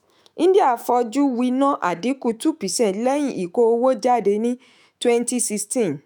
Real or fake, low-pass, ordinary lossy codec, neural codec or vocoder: fake; none; none; autoencoder, 48 kHz, 128 numbers a frame, DAC-VAE, trained on Japanese speech